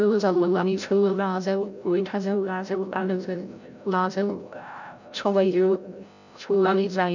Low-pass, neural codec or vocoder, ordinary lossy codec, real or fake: 7.2 kHz; codec, 16 kHz, 0.5 kbps, FreqCodec, larger model; none; fake